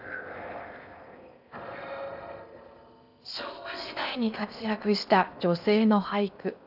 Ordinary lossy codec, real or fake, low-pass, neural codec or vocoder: none; fake; 5.4 kHz; codec, 16 kHz in and 24 kHz out, 0.6 kbps, FocalCodec, streaming, 4096 codes